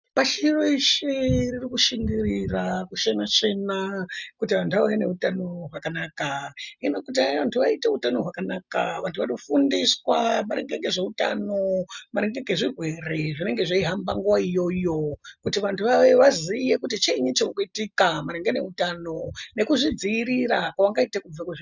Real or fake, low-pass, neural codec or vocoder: real; 7.2 kHz; none